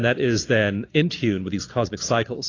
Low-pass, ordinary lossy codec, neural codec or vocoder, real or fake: 7.2 kHz; AAC, 32 kbps; none; real